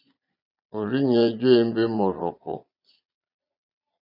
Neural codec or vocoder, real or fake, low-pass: vocoder, 22.05 kHz, 80 mel bands, Vocos; fake; 5.4 kHz